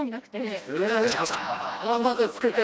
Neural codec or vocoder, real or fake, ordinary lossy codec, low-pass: codec, 16 kHz, 1 kbps, FreqCodec, smaller model; fake; none; none